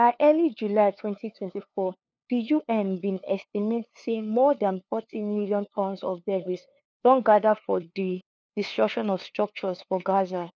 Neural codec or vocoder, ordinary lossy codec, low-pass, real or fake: codec, 16 kHz, 2 kbps, FunCodec, trained on LibriTTS, 25 frames a second; none; none; fake